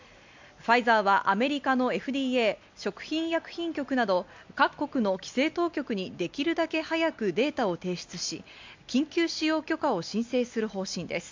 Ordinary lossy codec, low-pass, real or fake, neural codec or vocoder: MP3, 64 kbps; 7.2 kHz; real; none